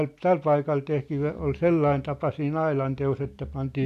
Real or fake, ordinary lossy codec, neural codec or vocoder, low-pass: real; none; none; 14.4 kHz